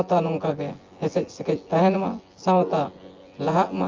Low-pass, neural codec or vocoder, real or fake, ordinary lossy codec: 7.2 kHz; vocoder, 24 kHz, 100 mel bands, Vocos; fake; Opus, 32 kbps